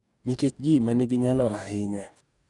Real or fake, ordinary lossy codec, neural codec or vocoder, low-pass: fake; none; codec, 44.1 kHz, 2.6 kbps, DAC; 10.8 kHz